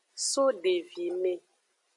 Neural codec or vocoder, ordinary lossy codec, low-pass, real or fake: none; MP3, 64 kbps; 10.8 kHz; real